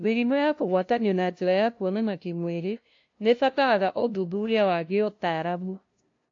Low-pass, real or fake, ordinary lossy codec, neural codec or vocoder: 7.2 kHz; fake; AAC, 48 kbps; codec, 16 kHz, 0.5 kbps, FunCodec, trained on LibriTTS, 25 frames a second